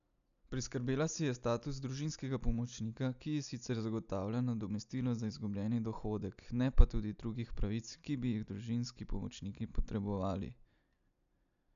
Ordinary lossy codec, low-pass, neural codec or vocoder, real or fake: none; 7.2 kHz; none; real